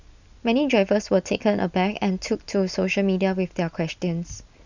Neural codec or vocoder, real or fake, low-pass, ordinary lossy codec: none; real; 7.2 kHz; none